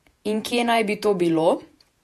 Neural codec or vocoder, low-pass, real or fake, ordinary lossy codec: vocoder, 48 kHz, 128 mel bands, Vocos; 14.4 kHz; fake; MP3, 64 kbps